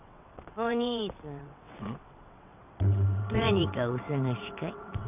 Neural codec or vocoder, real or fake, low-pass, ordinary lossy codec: vocoder, 22.05 kHz, 80 mel bands, WaveNeXt; fake; 3.6 kHz; none